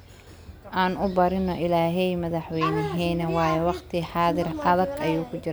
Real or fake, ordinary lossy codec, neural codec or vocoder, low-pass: real; none; none; none